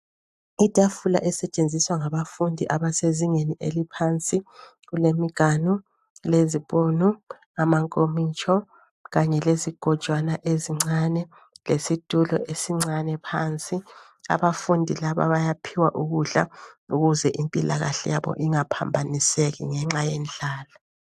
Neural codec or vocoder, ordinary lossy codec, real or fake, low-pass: none; AAC, 96 kbps; real; 14.4 kHz